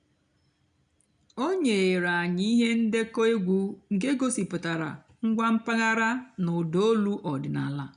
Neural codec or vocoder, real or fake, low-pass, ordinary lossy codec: none; real; 9.9 kHz; none